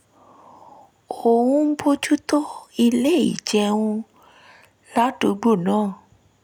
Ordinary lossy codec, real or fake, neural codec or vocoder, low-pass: none; real; none; none